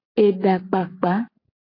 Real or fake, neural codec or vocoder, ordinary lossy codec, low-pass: real; none; AAC, 32 kbps; 5.4 kHz